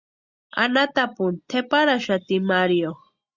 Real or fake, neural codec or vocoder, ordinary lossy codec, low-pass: real; none; Opus, 64 kbps; 7.2 kHz